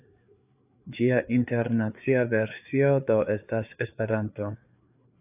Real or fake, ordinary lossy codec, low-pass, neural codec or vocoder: fake; AAC, 32 kbps; 3.6 kHz; codec, 16 kHz, 4 kbps, FreqCodec, larger model